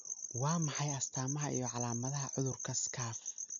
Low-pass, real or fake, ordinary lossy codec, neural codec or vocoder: 7.2 kHz; real; none; none